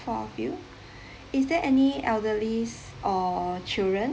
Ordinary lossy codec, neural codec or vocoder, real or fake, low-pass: none; none; real; none